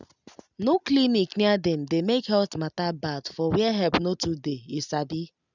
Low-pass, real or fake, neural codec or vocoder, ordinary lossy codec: 7.2 kHz; real; none; none